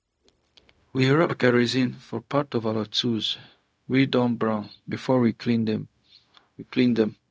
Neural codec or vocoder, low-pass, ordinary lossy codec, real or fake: codec, 16 kHz, 0.4 kbps, LongCat-Audio-Codec; none; none; fake